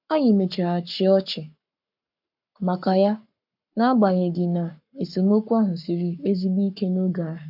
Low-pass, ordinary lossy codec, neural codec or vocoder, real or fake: 5.4 kHz; none; codec, 44.1 kHz, 7.8 kbps, Pupu-Codec; fake